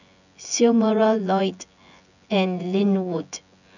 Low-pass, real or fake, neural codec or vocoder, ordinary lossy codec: 7.2 kHz; fake; vocoder, 24 kHz, 100 mel bands, Vocos; none